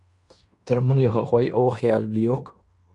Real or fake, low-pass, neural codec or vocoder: fake; 10.8 kHz; codec, 16 kHz in and 24 kHz out, 0.9 kbps, LongCat-Audio-Codec, fine tuned four codebook decoder